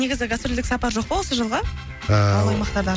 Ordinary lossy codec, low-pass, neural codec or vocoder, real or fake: none; none; none; real